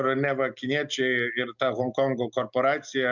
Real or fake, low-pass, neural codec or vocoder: real; 7.2 kHz; none